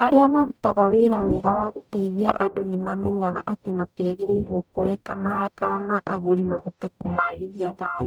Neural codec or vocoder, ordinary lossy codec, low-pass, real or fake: codec, 44.1 kHz, 0.9 kbps, DAC; none; none; fake